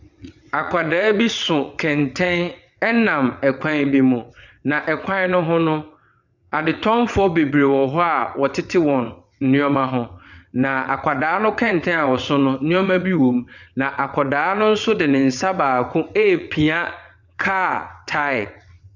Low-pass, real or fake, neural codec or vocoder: 7.2 kHz; fake; vocoder, 22.05 kHz, 80 mel bands, WaveNeXt